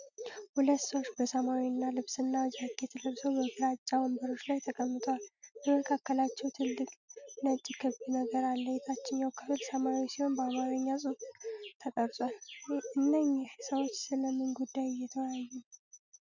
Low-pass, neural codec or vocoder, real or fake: 7.2 kHz; none; real